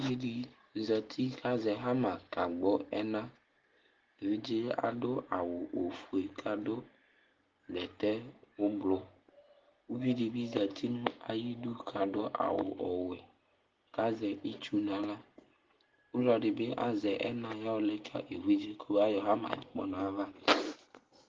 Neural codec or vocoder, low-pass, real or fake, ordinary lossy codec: none; 7.2 kHz; real; Opus, 16 kbps